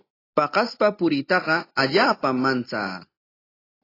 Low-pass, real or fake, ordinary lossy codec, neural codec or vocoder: 5.4 kHz; real; AAC, 24 kbps; none